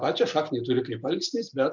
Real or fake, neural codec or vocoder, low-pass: real; none; 7.2 kHz